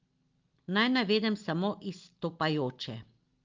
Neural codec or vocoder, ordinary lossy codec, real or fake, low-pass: none; Opus, 32 kbps; real; 7.2 kHz